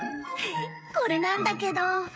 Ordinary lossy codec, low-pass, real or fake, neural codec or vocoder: none; none; fake; codec, 16 kHz, 16 kbps, FreqCodec, smaller model